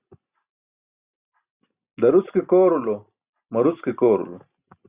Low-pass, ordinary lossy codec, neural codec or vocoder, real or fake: 3.6 kHz; Opus, 64 kbps; none; real